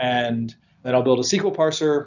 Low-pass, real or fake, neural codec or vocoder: 7.2 kHz; real; none